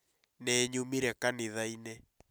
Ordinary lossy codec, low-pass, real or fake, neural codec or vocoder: none; none; real; none